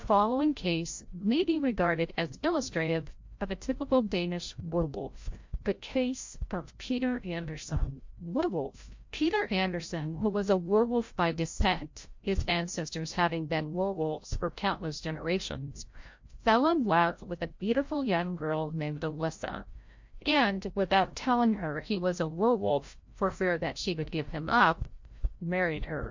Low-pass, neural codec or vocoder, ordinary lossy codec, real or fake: 7.2 kHz; codec, 16 kHz, 0.5 kbps, FreqCodec, larger model; MP3, 48 kbps; fake